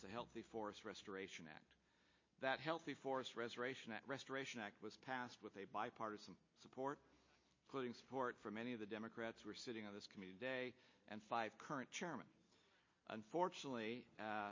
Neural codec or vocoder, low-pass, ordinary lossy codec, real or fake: none; 7.2 kHz; MP3, 32 kbps; real